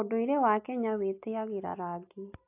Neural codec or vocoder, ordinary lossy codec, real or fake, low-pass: none; AAC, 32 kbps; real; 3.6 kHz